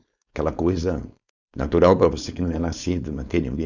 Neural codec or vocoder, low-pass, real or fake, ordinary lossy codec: codec, 16 kHz, 4.8 kbps, FACodec; 7.2 kHz; fake; none